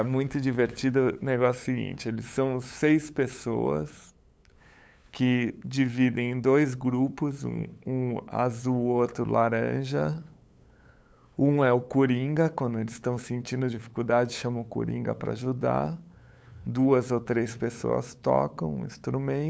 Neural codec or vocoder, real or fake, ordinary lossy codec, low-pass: codec, 16 kHz, 8 kbps, FunCodec, trained on LibriTTS, 25 frames a second; fake; none; none